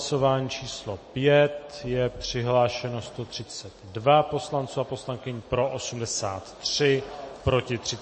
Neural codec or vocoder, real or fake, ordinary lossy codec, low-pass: none; real; MP3, 32 kbps; 10.8 kHz